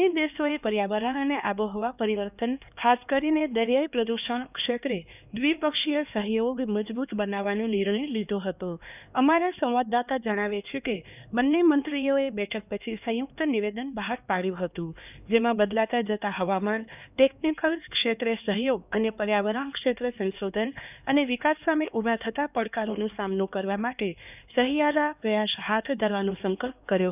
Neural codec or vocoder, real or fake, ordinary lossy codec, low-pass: codec, 16 kHz, 2 kbps, X-Codec, HuBERT features, trained on LibriSpeech; fake; none; 3.6 kHz